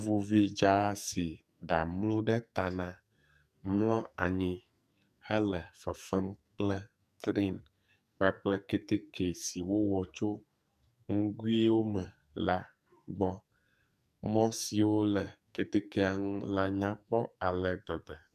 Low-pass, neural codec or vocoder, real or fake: 14.4 kHz; codec, 44.1 kHz, 2.6 kbps, SNAC; fake